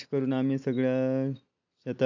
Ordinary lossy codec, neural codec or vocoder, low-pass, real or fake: AAC, 48 kbps; none; 7.2 kHz; real